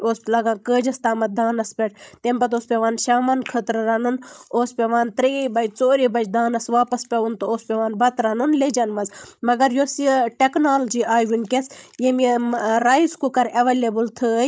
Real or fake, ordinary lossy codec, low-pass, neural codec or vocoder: fake; none; none; codec, 16 kHz, 16 kbps, FreqCodec, larger model